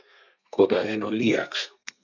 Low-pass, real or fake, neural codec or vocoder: 7.2 kHz; fake; codec, 32 kHz, 1.9 kbps, SNAC